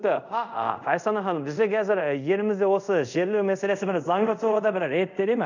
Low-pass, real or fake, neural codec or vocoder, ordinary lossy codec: 7.2 kHz; fake; codec, 24 kHz, 0.5 kbps, DualCodec; none